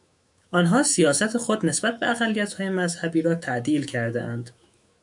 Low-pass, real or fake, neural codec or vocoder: 10.8 kHz; fake; autoencoder, 48 kHz, 128 numbers a frame, DAC-VAE, trained on Japanese speech